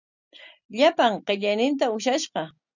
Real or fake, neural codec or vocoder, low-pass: real; none; 7.2 kHz